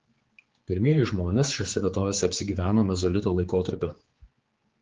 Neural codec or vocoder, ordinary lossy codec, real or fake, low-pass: codec, 16 kHz, 4 kbps, X-Codec, HuBERT features, trained on general audio; Opus, 16 kbps; fake; 7.2 kHz